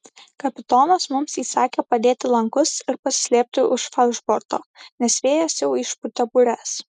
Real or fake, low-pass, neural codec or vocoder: real; 10.8 kHz; none